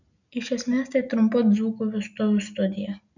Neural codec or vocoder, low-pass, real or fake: none; 7.2 kHz; real